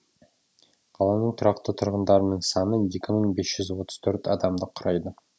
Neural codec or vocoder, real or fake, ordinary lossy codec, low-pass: none; real; none; none